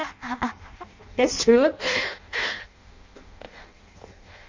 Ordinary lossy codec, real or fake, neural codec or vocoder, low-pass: MP3, 64 kbps; fake; codec, 16 kHz in and 24 kHz out, 0.6 kbps, FireRedTTS-2 codec; 7.2 kHz